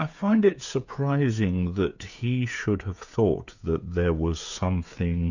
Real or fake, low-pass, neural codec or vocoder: fake; 7.2 kHz; codec, 16 kHz, 16 kbps, FreqCodec, smaller model